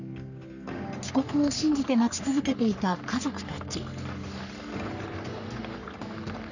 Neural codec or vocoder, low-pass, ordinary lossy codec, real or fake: codec, 44.1 kHz, 3.4 kbps, Pupu-Codec; 7.2 kHz; none; fake